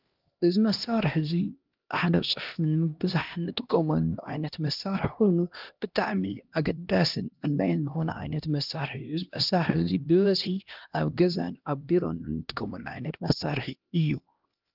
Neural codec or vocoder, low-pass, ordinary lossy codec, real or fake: codec, 16 kHz, 1 kbps, X-Codec, HuBERT features, trained on LibriSpeech; 5.4 kHz; Opus, 32 kbps; fake